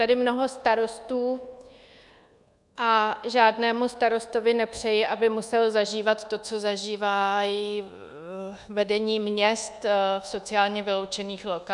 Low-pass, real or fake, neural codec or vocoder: 10.8 kHz; fake; codec, 24 kHz, 1.2 kbps, DualCodec